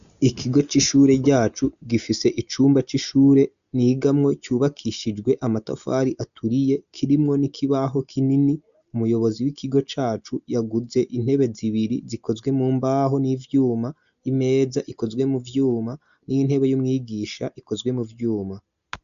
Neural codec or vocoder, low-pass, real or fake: none; 7.2 kHz; real